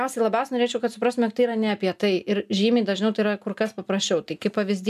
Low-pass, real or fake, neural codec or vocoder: 14.4 kHz; real; none